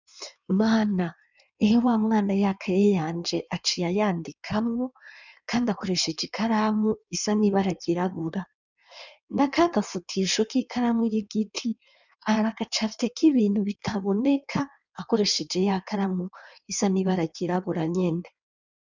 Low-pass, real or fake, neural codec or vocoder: 7.2 kHz; fake; codec, 16 kHz in and 24 kHz out, 1.1 kbps, FireRedTTS-2 codec